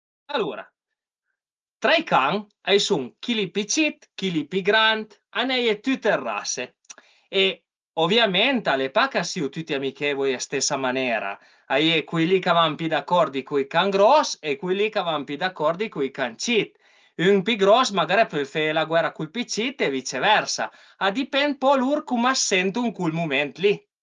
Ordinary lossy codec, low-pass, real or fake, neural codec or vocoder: Opus, 24 kbps; 7.2 kHz; real; none